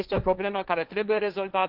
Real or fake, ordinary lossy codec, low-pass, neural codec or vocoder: fake; Opus, 24 kbps; 5.4 kHz; codec, 16 kHz, 1.1 kbps, Voila-Tokenizer